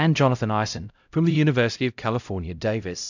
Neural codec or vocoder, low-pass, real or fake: codec, 16 kHz, 0.5 kbps, X-Codec, HuBERT features, trained on LibriSpeech; 7.2 kHz; fake